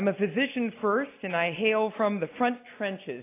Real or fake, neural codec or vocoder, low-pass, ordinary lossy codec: real; none; 3.6 kHz; AAC, 24 kbps